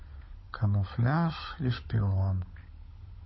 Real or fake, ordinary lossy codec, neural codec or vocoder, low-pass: fake; MP3, 24 kbps; codec, 16 kHz, 16 kbps, FunCodec, trained on LibriTTS, 50 frames a second; 7.2 kHz